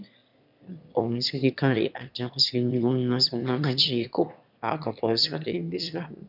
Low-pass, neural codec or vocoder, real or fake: 5.4 kHz; autoencoder, 22.05 kHz, a latent of 192 numbers a frame, VITS, trained on one speaker; fake